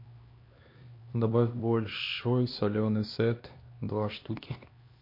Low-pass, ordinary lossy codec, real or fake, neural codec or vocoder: 5.4 kHz; MP3, 32 kbps; fake; codec, 16 kHz, 2 kbps, X-Codec, HuBERT features, trained on LibriSpeech